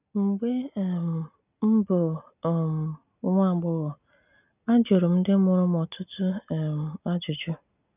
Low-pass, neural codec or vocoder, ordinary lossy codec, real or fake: 3.6 kHz; none; none; real